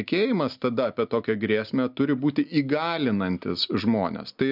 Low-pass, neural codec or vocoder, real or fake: 5.4 kHz; none; real